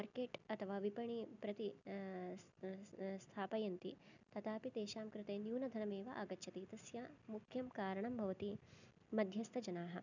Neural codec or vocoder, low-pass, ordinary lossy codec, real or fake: none; 7.2 kHz; none; real